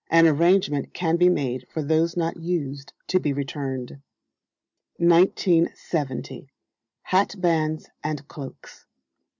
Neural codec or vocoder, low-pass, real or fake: none; 7.2 kHz; real